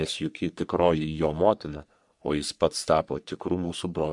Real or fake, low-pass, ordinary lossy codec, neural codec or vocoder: fake; 10.8 kHz; MP3, 96 kbps; codec, 44.1 kHz, 3.4 kbps, Pupu-Codec